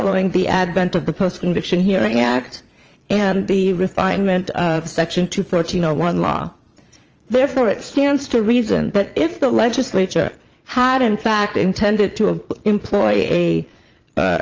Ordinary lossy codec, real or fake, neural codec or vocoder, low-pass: Opus, 24 kbps; fake; vocoder, 22.05 kHz, 80 mel bands, Vocos; 7.2 kHz